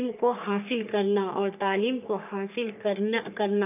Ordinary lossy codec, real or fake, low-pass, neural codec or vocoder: none; fake; 3.6 kHz; codec, 44.1 kHz, 3.4 kbps, Pupu-Codec